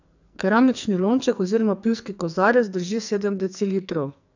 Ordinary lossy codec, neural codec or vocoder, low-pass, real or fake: none; codec, 44.1 kHz, 2.6 kbps, SNAC; 7.2 kHz; fake